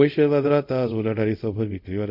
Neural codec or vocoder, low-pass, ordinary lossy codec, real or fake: codec, 24 kHz, 0.5 kbps, DualCodec; 5.4 kHz; none; fake